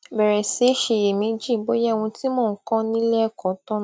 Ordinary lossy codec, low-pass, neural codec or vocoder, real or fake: none; none; none; real